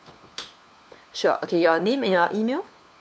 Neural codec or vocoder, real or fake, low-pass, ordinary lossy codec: codec, 16 kHz, 4 kbps, FunCodec, trained on LibriTTS, 50 frames a second; fake; none; none